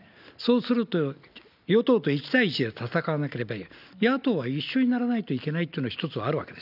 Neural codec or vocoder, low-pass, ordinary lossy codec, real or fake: none; 5.4 kHz; none; real